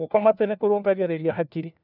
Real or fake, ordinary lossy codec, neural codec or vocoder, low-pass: fake; none; codec, 16 kHz, 1 kbps, FunCodec, trained on LibriTTS, 50 frames a second; 5.4 kHz